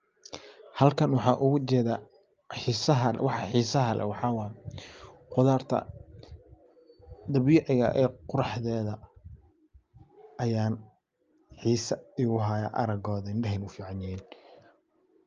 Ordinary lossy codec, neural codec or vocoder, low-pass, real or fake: Opus, 24 kbps; none; 7.2 kHz; real